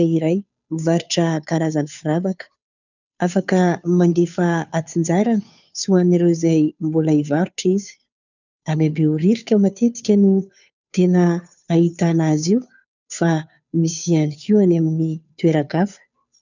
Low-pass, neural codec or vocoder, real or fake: 7.2 kHz; codec, 16 kHz, 2 kbps, FunCodec, trained on Chinese and English, 25 frames a second; fake